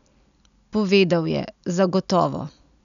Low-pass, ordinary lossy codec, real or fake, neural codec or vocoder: 7.2 kHz; none; real; none